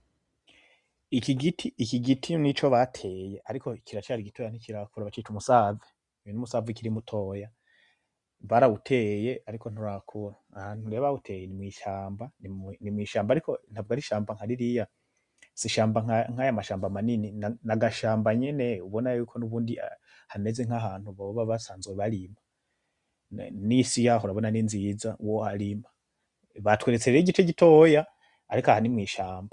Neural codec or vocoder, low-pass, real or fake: none; 10.8 kHz; real